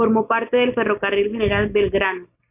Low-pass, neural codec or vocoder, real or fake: 3.6 kHz; none; real